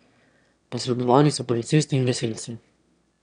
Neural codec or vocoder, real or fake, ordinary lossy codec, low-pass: autoencoder, 22.05 kHz, a latent of 192 numbers a frame, VITS, trained on one speaker; fake; none; 9.9 kHz